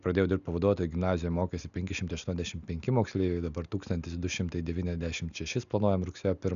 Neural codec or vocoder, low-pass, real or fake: none; 7.2 kHz; real